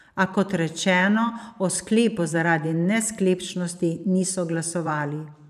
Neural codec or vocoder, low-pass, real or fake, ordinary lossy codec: vocoder, 44.1 kHz, 128 mel bands every 512 samples, BigVGAN v2; 14.4 kHz; fake; none